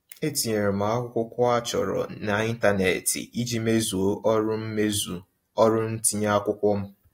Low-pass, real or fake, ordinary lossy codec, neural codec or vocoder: 19.8 kHz; real; AAC, 48 kbps; none